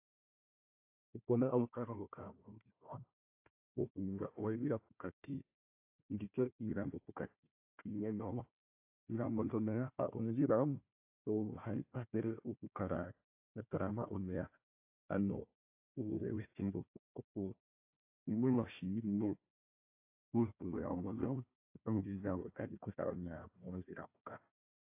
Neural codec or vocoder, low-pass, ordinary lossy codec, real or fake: codec, 16 kHz, 1 kbps, FunCodec, trained on Chinese and English, 50 frames a second; 3.6 kHz; AAC, 32 kbps; fake